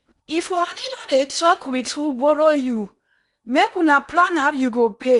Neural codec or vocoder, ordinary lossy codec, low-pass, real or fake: codec, 16 kHz in and 24 kHz out, 0.8 kbps, FocalCodec, streaming, 65536 codes; Opus, 64 kbps; 10.8 kHz; fake